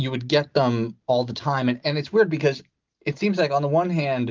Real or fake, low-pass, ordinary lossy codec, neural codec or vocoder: real; 7.2 kHz; Opus, 32 kbps; none